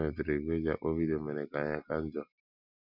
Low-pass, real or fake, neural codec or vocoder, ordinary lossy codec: 5.4 kHz; real; none; none